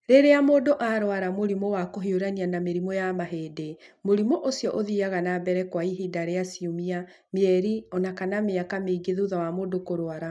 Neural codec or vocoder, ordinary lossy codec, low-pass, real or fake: none; none; none; real